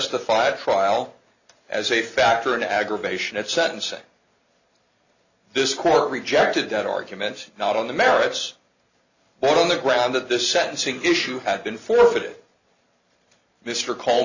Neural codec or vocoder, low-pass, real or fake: none; 7.2 kHz; real